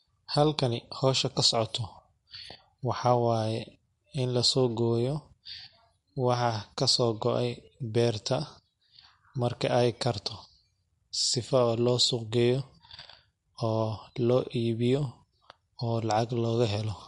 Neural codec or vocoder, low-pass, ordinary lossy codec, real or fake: none; 14.4 kHz; MP3, 48 kbps; real